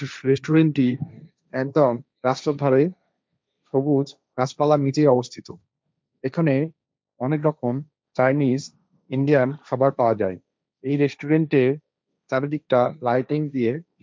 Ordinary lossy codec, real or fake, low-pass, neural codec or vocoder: none; fake; none; codec, 16 kHz, 1.1 kbps, Voila-Tokenizer